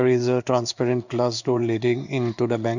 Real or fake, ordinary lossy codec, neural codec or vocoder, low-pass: fake; none; codec, 16 kHz in and 24 kHz out, 1 kbps, XY-Tokenizer; 7.2 kHz